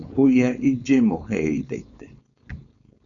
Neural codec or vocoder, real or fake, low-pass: codec, 16 kHz, 4.8 kbps, FACodec; fake; 7.2 kHz